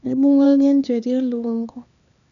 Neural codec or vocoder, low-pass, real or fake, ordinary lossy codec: codec, 16 kHz, 2 kbps, X-Codec, HuBERT features, trained on LibriSpeech; 7.2 kHz; fake; none